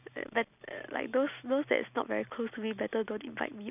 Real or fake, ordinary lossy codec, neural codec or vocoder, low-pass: real; none; none; 3.6 kHz